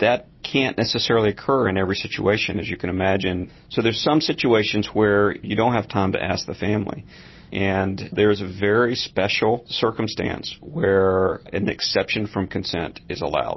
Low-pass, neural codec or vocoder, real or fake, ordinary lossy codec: 7.2 kHz; none; real; MP3, 24 kbps